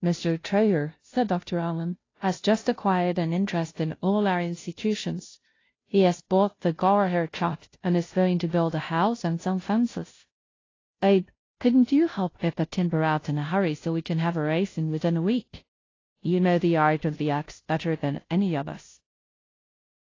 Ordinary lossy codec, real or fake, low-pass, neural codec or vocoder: AAC, 32 kbps; fake; 7.2 kHz; codec, 16 kHz, 0.5 kbps, FunCodec, trained on Chinese and English, 25 frames a second